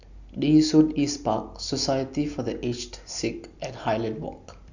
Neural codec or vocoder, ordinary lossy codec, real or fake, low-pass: none; none; real; 7.2 kHz